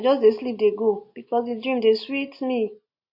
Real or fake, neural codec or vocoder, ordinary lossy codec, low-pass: real; none; MP3, 32 kbps; 5.4 kHz